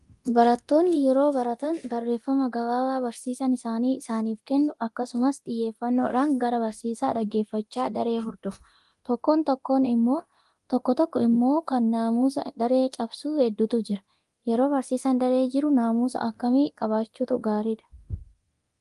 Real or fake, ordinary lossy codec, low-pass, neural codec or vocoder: fake; Opus, 24 kbps; 10.8 kHz; codec, 24 kHz, 0.9 kbps, DualCodec